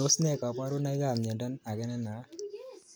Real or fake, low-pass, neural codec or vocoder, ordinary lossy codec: real; none; none; none